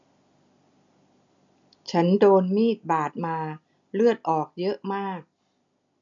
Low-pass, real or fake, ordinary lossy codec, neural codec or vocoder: 7.2 kHz; real; none; none